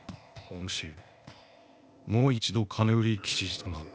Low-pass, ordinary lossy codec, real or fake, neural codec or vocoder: none; none; fake; codec, 16 kHz, 0.8 kbps, ZipCodec